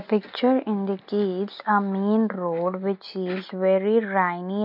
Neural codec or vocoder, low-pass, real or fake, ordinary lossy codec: none; 5.4 kHz; real; MP3, 48 kbps